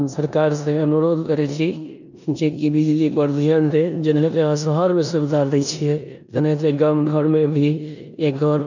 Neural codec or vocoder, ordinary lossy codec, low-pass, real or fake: codec, 16 kHz in and 24 kHz out, 0.9 kbps, LongCat-Audio-Codec, four codebook decoder; none; 7.2 kHz; fake